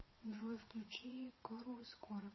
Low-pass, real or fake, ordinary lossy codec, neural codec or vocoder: 7.2 kHz; fake; MP3, 24 kbps; vocoder, 22.05 kHz, 80 mel bands, WaveNeXt